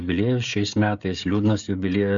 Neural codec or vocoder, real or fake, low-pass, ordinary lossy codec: codec, 16 kHz, 16 kbps, FreqCodec, smaller model; fake; 7.2 kHz; Opus, 64 kbps